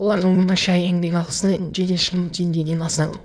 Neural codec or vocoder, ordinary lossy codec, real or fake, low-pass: autoencoder, 22.05 kHz, a latent of 192 numbers a frame, VITS, trained on many speakers; none; fake; none